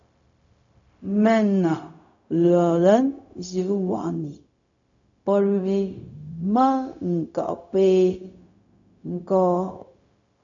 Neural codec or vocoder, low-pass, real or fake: codec, 16 kHz, 0.4 kbps, LongCat-Audio-Codec; 7.2 kHz; fake